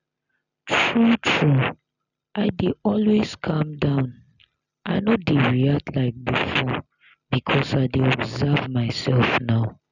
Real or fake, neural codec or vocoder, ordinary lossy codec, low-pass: real; none; none; 7.2 kHz